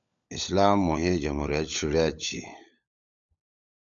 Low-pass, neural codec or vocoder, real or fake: 7.2 kHz; codec, 16 kHz, 16 kbps, FunCodec, trained on LibriTTS, 50 frames a second; fake